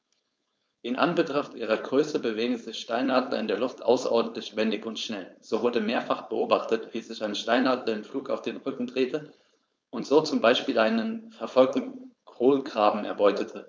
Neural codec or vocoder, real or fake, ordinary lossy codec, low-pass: codec, 16 kHz, 4.8 kbps, FACodec; fake; none; none